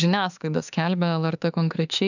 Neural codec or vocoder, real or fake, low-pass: autoencoder, 48 kHz, 32 numbers a frame, DAC-VAE, trained on Japanese speech; fake; 7.2 kHz